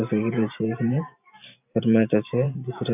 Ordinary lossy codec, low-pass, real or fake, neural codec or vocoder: none; 3.6 kHz; fake; vocoder, 44.1 kHz, 128 mel bands every 512 samples, BigVGAN v2